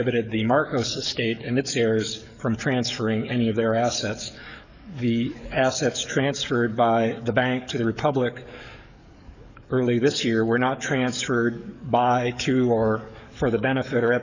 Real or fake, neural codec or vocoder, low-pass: fake; codec, 44.1 kHz, 7.8 kbps, DAC; 7.2 kHz